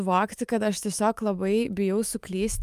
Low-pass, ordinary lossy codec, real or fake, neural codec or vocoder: 14.4 kHz; Opus, 32 kbps; fake; autoencoder, 48 kHz, 128 numbers a frame, DAC-VAE, trained on Japanese speech